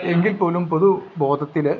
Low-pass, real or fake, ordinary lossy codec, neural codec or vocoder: 7.2 kHz; real; none; none